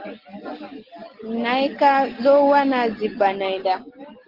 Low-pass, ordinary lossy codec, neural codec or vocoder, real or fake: 5.4 kHz; Opus, 16 kbps; none; real